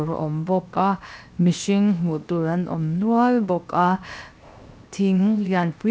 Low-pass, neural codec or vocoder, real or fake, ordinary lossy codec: none; codec, 16 kHz, 0.7 kbps, FocalCodec; fake; none